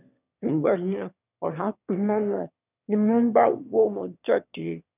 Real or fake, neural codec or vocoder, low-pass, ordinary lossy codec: fake; autoencoder, 22.05 kHz, a latent of 192 numbers a frame, VITS, trained on one speaker; 3.6 kHz; none